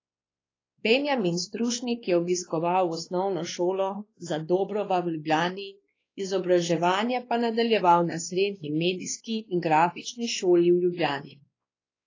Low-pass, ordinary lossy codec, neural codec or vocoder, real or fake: 7.2 kHz; AAC, 32 kbps; codec, 16 kHz, 2 kbps, X-Codec, WavLM features, trained on Multilingual LibriSpeech; fake